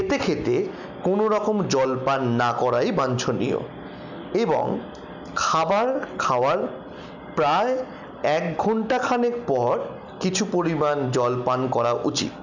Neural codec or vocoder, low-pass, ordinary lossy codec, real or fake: none; 7.2 kHz; none; real